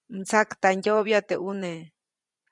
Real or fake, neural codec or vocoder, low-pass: fake; vocoder, 24 kHz, 100 mel bands, Vocos; 10.8 kHz